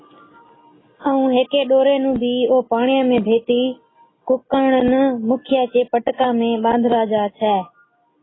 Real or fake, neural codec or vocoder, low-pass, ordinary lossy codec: real; none; 7.2 kHz; AAC, 16 kbps